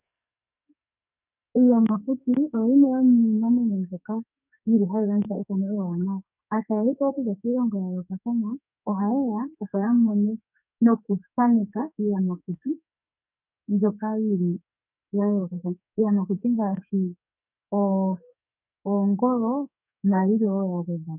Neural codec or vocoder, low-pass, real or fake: codec, 44.1 kHz, 2.6 kbps, SNAC; 3.6 kHz; fake